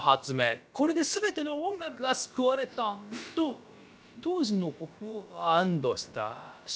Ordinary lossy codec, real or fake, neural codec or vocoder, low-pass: none; fake; codec, 16 kHz, about 1 kbps, DyCAST, with the encoder's durations; none